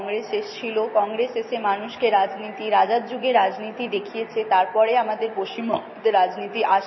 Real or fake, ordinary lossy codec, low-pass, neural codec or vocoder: real; MP3, 24 kbps; 7.2 kHz; none